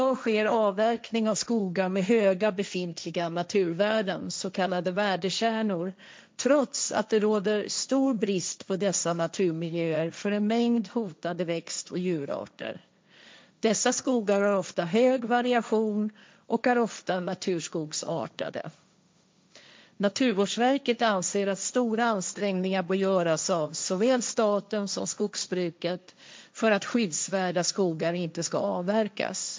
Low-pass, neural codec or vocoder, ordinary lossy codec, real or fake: none; codec, 16 kHz, 1.1 kbps, Voila-Tokenizer; none; fake